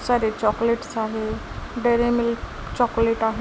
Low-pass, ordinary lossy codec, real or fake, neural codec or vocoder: none; none; real; none